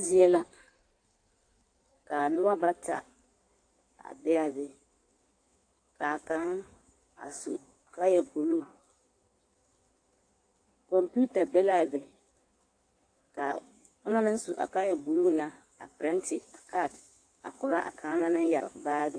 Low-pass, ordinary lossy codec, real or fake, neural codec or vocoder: 9.9 kHz; AAC, 48 kbps; fake; codec, 16 kHz in and 24 kHz out, 1.1 kbps, FireRedTTS-2 codec